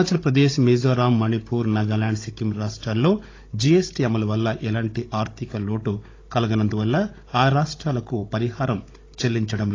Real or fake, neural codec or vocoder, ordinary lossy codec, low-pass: fake; codec, 16 kHz, 16 kbps, FunCodec, trained on Chinese and English, 50 frames a second; AAC, 32 kbps; 7.2 kHz